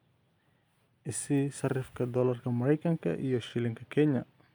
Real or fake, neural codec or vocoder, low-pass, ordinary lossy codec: real; none; none; none